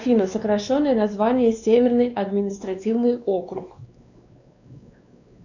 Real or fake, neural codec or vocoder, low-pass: fake; codec, 16 kHz, 2 kbps, X-Codec, WavLM features, trained on Multilingual LibriSpeech; 7.2 kHz